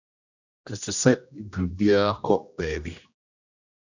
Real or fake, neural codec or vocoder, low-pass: fake; codec, 16 kHz, 1 kbps, X-Codec, HuBERT features, trained on general audio; 7.2 kHz